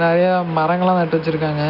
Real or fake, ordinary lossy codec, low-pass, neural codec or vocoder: real; none; 5.4 kHz; none